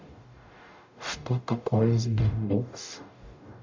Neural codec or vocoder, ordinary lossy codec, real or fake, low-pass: codec, 44.1 kHz, 0.9 kbps, DAC; MP3, 64 kbps; fake; 7.2 kHz